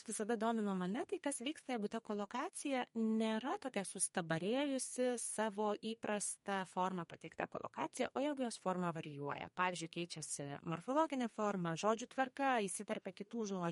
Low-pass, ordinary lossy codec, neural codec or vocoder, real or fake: 14.4 kHz; MP3, 48 kbps; codec, 32 kHz, 1.9 kbps, SNAC; fake